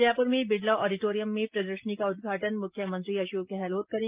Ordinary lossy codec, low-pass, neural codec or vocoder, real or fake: Opus, 32 kbps; 3.6 kHz; none; real